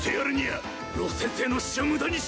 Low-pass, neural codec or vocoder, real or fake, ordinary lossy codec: none; none; real; none